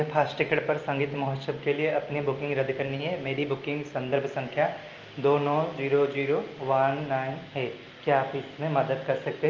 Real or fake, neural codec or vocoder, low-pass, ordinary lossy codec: real; none; 7.2 kHz; Opus, 24 kbps